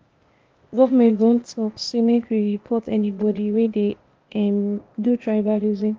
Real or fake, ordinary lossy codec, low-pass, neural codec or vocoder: fake; Opus, 24 kbps; 7.2 kHz; codec, 16 kHz, 0.8 kbps, ZipCodec